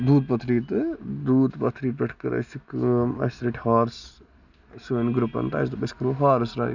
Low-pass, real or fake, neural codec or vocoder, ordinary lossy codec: 7.2 kHz; real; none; none